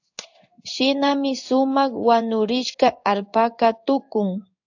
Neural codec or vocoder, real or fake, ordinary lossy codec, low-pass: codec, 16 kHz in and 24 kHz out, 1 kbps, XY-Tokenizer; fake; AAC, 48 kbps; 7.2 kHz